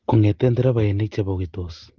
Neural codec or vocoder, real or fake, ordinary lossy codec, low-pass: none; real; Opus, 16 kbps; 7.2 kHz